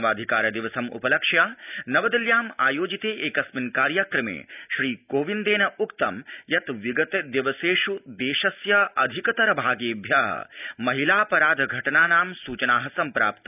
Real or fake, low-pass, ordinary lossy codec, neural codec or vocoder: real; 3.6 kHz; none; none